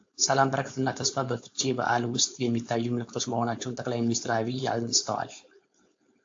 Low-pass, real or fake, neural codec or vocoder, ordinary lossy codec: 7.2 kHz; fake; codec, 16 kHz, 4.8 kbps, FACodec; AAC, 48 kbps